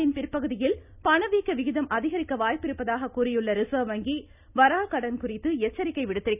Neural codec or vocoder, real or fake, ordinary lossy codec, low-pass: none; real; none; 3.6 kHz